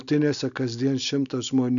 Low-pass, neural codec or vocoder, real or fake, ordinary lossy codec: 7.2 kHz; none; real; MP3, 96 kbps